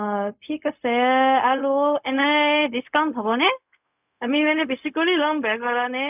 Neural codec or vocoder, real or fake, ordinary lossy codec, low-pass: codec, 16 kHz, 0.4 kbps, LongCat-Audio-Codec; fake; none; 3.6 kHz